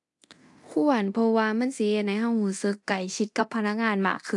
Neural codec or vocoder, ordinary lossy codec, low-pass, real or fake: codec, 24 kHz, 0.9 kbps, DualCodec; AAC, 64 kbps; 10.8 kHz; fake